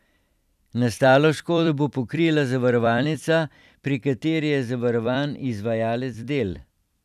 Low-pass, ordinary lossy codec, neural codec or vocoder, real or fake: 14.4 kHz; none; vocoder, 44.1 kHz, 128 mel bands every 256 samples, BigVGAN v2; fake